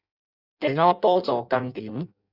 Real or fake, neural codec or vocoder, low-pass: fake; codec, 16 kHz in and 24 kHz out, 0.6 kbps, FireRedTTS-2 codec; 5.4 kHz